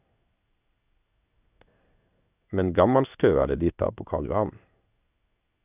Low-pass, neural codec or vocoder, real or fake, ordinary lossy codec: 3.6 kHz; codec, 16 kHz in and 24 kHz out, 1 kbps, XY-Tokenizer; fake; none